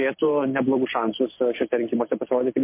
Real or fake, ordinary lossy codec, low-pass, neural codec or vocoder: real; MP3, 24 kbps; 3.6 kHz; none